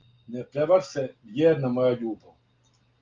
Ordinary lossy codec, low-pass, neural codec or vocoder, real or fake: Opus, 32 kbps; 7.2 kHz; none; real